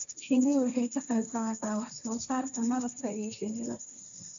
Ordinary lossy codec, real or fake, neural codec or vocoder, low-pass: AAC, 64 kbps; fake; codec, 16 kHz, 1.1 kbps, Voila-Tokenizer; 7.2 kHz